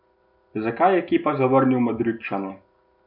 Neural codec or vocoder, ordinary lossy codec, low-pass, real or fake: none; none; 5.4 kHz; real